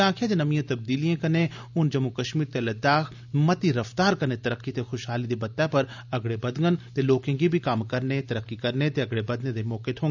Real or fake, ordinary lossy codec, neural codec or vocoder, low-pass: real; none; none; 7.2 kHz